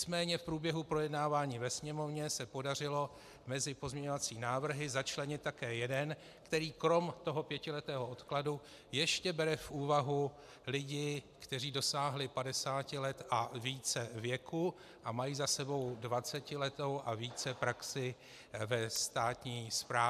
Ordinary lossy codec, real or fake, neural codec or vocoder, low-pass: AAC, 96 kbps; real; none; 14.4 kHz